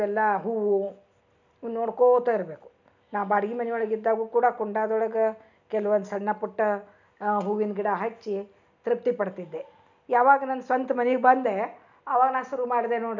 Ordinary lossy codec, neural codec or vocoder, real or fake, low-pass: none; none; real; 7.2 kHz